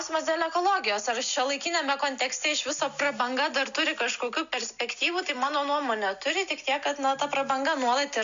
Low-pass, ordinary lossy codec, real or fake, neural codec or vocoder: 7.2 kHz; MP3, 64 kbps; real; none